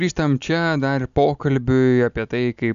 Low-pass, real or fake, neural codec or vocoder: 7.2 kHz; real; none